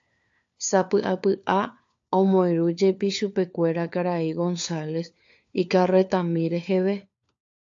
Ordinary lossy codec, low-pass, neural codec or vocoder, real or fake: AAC, 64 kbps; 7.2 kHz; codec, 16 kHz, 4 kbps, FunCodec, trained on LibriTTS, 50 frames a second; fake